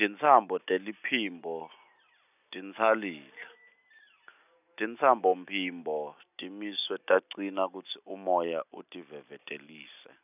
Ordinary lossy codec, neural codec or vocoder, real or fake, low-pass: none; none; real; 3.6 kHz